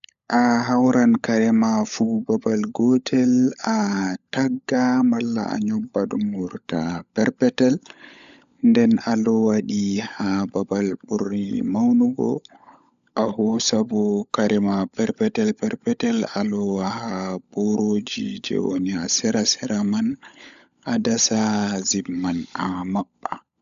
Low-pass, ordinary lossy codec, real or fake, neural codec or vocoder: 7.2 kHz; AAC, 96 kbps; fake; codec, 16 kHz, 16 kbps, FunCodec, trained on LibriTTS, 50 frames a second